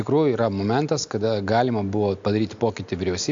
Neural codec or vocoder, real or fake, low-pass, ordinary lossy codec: none; real; 7.2 kHz; AAC, 64 kbps